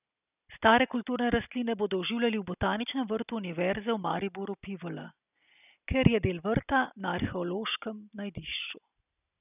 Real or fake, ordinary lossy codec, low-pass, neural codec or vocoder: real; none; 3.6 kHz; none